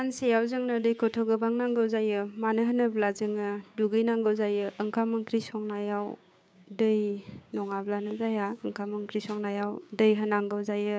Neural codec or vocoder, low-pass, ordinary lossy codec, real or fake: codec, 16 kHz, 6 kbps, DAC; none; none; fake